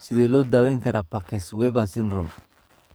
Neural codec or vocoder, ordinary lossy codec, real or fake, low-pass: codec, 44.1 kHz, 2.6 kbps, SNAC; none; fake; none